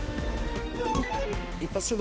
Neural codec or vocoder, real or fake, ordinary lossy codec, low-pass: codec, 16 kHz, 2 kbps, X-Codec, HuBERT features, trained on balanced general audio; fake; none; none